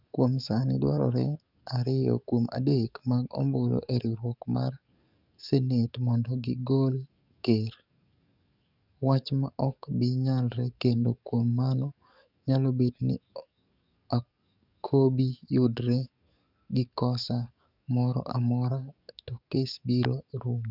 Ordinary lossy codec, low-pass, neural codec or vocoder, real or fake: none; 5.4 kHz; codec, 44.1 kHz, 7.8 kbps, DAC; fake